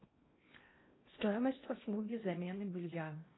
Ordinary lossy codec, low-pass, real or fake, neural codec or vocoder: AAC, 16 kbps; 7.2 kHz; fake; codec, 16 kHz in and 24 kHz out, 0.8 kbps, FocalCodec, streaming, 65536 codes